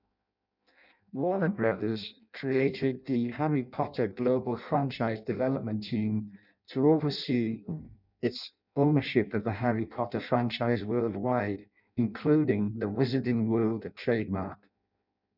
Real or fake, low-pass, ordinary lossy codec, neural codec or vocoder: fake; 5.4 kHz; none; codec, 16 kHz in and 24 kHz out, 0.6 kbps, FireRedTTS-2 codec